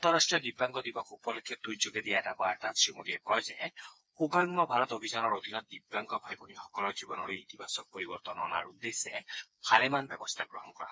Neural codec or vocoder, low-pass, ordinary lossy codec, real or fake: codec, 16 kHz, 4 kbps, FreqCodec, smaller model; none; none; fake